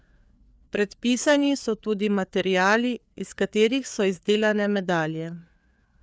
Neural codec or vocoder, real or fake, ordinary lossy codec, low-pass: codec, 16 kHz, 4 kbps, FreqCodec, larger model; fake; none; none